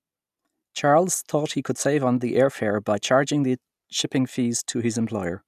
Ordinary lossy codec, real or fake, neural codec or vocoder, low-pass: none; real; none; 14.4 kHz